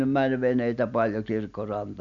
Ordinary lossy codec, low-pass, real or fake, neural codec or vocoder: none; 7.2 kHz; real; none